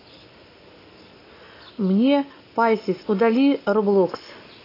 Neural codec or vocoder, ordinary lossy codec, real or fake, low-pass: none; AAC, 24 kbps; real; 5.4 kHz